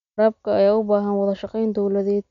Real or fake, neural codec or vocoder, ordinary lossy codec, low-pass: real; none; none; 7.2 kHz